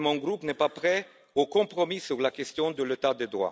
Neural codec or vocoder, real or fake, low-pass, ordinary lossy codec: none; real; none; none